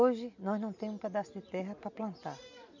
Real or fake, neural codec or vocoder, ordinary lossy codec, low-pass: real; none; none; 7.2 kHz